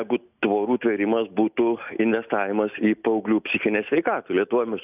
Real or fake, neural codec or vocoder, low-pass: real; none; 3.6 kHz